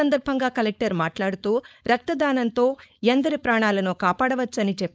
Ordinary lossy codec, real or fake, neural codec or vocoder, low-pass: none; fake; codec, 16 kHz, 4.8 kbps, FACodec; none